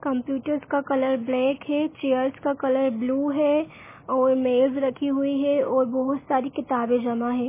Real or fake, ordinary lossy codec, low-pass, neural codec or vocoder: real; MP3, 16 kbps; 3.6 kHz; none